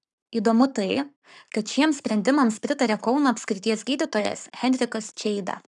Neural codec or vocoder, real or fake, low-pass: codec, 44.1 kHz, 7.8 kbps, Pupu-Codec; fake; 10.8 kHz